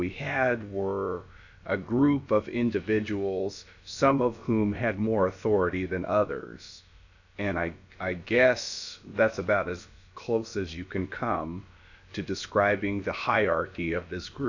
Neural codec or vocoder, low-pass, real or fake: codec, 16 kHz, about 1 kbps, DyCAST, with the encoder's durations; 7.2 kHz; fake